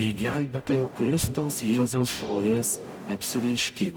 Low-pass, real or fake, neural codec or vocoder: 19.8 kHz; fake; codec, 44.1 kHz, 0.9 kbps, DAC